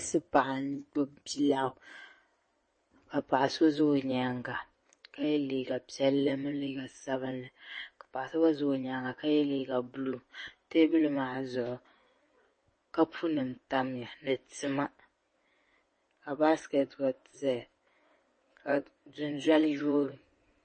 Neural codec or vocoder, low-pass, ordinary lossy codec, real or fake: codec, 16 kHz in and 24 kHz out, 2.2 kbps, FireRedTTS-2 codec; 9.9 kHz; MP3, 32 kbps; fake